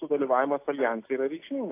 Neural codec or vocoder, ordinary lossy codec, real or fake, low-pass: none; AAC, 24 kbps; real; 3.6 kHz